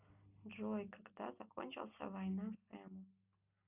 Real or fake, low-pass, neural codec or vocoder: real; 3.6 kHz; none